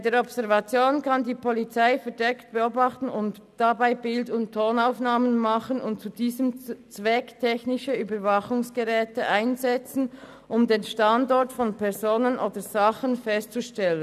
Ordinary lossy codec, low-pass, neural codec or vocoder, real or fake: none; 14.4 kHz; none; real